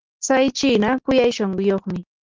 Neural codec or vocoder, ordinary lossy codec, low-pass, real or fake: none; Opus, 16 kbps; 7.2 kHz; real